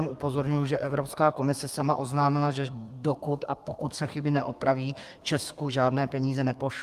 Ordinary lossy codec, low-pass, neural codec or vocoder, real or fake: Opus, 32 kbps; 14.4 kHz; codec, 32 kHz, 1.9 kbps, SNAC; fake